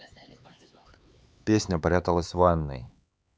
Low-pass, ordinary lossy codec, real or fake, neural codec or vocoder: none; none; fake; codec, 16 kHz, 4 kbps, X-Codec, HuBERT features, trained on LibriSpeech